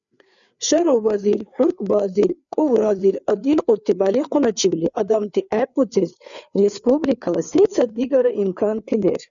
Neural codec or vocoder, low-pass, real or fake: codec, 16 kHz, 4 kbps, FreqCodec, larger model; 7.2 kHz; fake